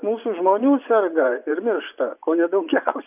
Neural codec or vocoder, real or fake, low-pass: vocoder, 24 kHz, 100 mel bands, Vocos; fake; 3.6 kHz